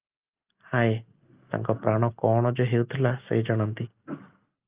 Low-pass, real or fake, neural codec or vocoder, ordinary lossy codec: 3.6 kHz; real; none; Opus, 24 kbps